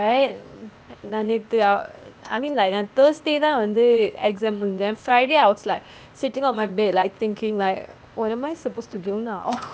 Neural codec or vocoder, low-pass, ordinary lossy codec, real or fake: codec, 16 kHz, 0.8 kbps, ZipCodec; none; none; fake